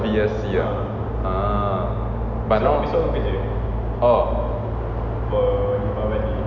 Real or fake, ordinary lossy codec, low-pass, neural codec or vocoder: real; none; 7.2 kHz; none